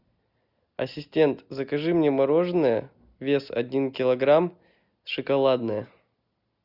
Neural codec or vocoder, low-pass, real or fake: none; 5.4 kHz; real